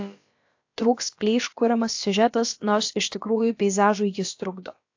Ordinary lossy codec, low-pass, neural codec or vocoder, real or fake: AAC, 48 kbps; 7.2 kHz; codec, 16 kHz, about 1 kbps, DyCAST, with the encoder's durations; fake